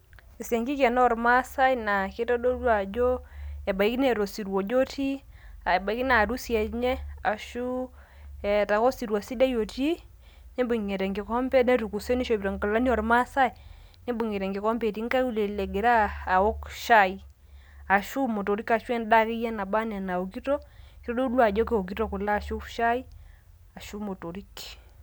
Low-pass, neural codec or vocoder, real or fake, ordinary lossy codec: none; none; real; none